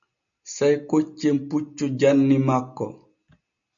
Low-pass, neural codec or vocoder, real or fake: 7.2 kHz; none; real